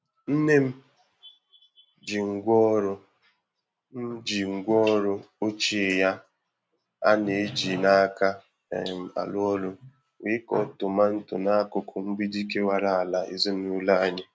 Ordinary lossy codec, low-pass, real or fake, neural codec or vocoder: none; none; real; none